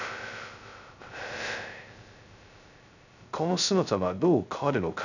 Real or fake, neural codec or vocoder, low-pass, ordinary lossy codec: fake; codec, 16 kHz, 0.2 kbps, FocalCodec; 7.2 kHz; none